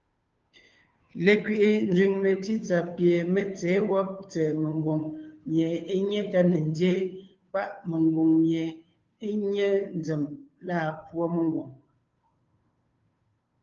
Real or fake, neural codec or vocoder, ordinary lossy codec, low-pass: fake; codec, 16 kHz, 2 kbps, FunCodec, trained on Chinese and English, 25 frames a second; Opus, 24 kbps; 7.2 kHz